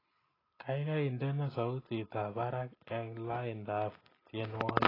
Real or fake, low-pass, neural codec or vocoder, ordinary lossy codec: real; 5.4 kHz; none; AAC, 24 kbps